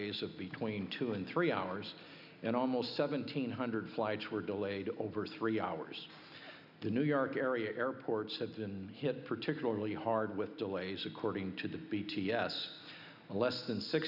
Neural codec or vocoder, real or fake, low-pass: none; real; 5.4 kHz